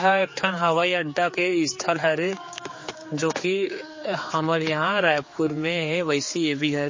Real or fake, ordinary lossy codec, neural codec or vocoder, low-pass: fake; MP3, 32 kbps; codec, 16 kHz, 4 kbps, X-Codec, HuBERT features, trained on general audio; 7.2 kHz